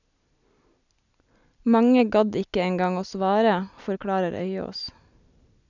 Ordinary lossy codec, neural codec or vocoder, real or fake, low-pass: none; none; real; 7.2 kHz